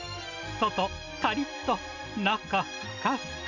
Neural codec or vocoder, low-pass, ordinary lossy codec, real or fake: none; 7.2 kHz; none; real